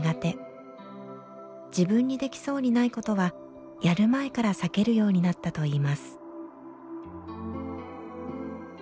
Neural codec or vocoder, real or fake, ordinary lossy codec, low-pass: none; real; none; none